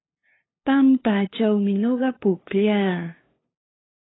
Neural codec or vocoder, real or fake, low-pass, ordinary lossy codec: codec, 16 kHz, 2 kbps, FunCodec, trained on LibriTTS, 25 frames a second; fake; 7.2 kHz; AAC, 16 kbps